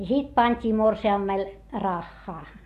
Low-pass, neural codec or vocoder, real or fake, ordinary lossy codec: 14.4 kHz; none; real; AAC, 64 kbps